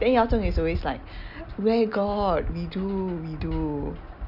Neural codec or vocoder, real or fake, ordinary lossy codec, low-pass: none; real; none; 5.4 kHz